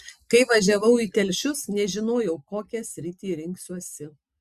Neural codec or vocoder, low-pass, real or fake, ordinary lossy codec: none; 14.4 kHz; real; Opus, 64 kbps